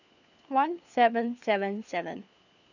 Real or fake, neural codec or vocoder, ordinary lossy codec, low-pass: fake; codec, 16 kHz, 4 kbps, FunCodec, trained on LibriTTS, 50 frames a second; none; 7.2 kHz